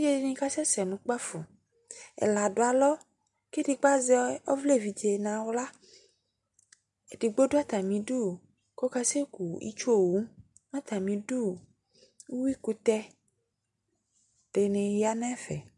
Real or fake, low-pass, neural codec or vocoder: real; 10.8 kHz; none